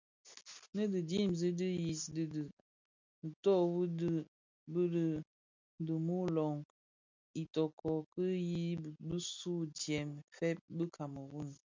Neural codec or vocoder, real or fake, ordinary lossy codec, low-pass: none; real; MP3, 64 kbps; 7.2 kHz